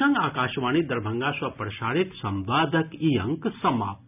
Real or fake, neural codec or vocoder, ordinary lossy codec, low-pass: real; none; none; 3.6 kHz